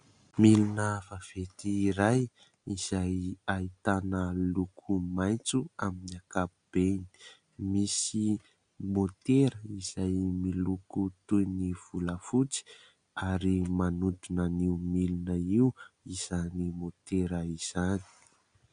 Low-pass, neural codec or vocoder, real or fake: 9.9 kHz; none; real